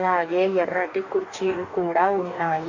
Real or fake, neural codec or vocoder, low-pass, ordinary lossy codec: fake; codec, 44.1 kHz, 2.6 kbps, DAC; 7.2 kHz; none